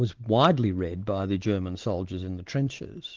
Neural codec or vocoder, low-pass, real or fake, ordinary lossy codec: none; 7.2 kHz; real; Opus, 16 kbps